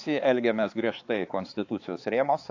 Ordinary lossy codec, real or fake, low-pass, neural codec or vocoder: AAC, 48 kbps; fake; 7.2 kHz; codec, 16 kHz, 4 kbps, X-Codec, HuBERT features, trained on balanced general audio